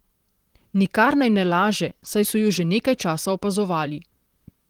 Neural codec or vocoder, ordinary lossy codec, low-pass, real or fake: vocoder, 44.1 kHz, 128 mel bands, Pupu-Vocoder; Opus, 24 kbps; 19.8 kHz; fake